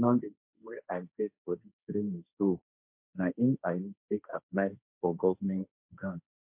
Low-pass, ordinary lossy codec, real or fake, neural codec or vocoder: 3.6 kHz; MP3, 32 kbps; fake; codec, 16 kHz, 1.1 kbps, Voila-Tokenizer